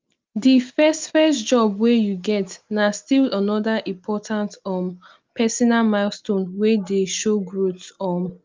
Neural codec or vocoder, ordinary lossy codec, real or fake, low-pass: none; Opus, 32 kbps; real; 7.2 kHz